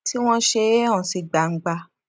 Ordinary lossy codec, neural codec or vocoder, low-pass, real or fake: none; none; none; real